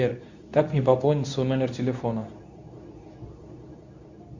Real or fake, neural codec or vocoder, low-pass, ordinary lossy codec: fake; codec, 24 kHz, 0.9 kbps, WavTokenizer, medium speech release version 2; 7.2 kHz; Opus, 64 kbps